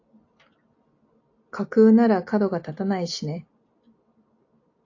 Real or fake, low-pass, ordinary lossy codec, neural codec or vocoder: real; 7.2 kHz; AAC, 48 kbps; none